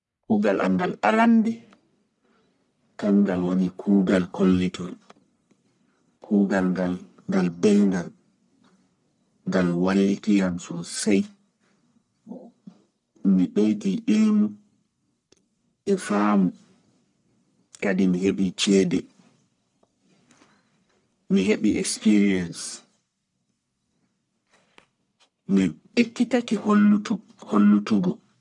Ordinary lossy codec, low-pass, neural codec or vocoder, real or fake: none; 10.8 kHz; codec, 44.1 kHz, 1.7 kbps, Pupu-Codec; fake